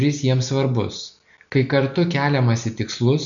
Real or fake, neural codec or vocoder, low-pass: real; none; 7.2 kHz